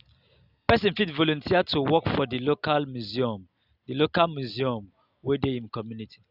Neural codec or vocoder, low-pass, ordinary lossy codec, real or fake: none; 5.4 kHz; Opus, 64 kbps; real